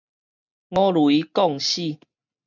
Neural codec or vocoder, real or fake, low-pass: none; real; 7.2 kHz